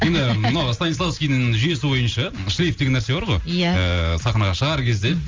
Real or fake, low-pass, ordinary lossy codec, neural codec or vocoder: real; 7.2 kHz; Opus, 32 kbps; none